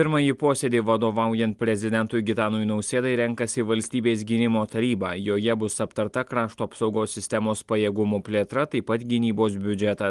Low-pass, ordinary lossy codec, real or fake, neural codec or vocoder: 10.8 kHz; Opus, 24 kbps; real; none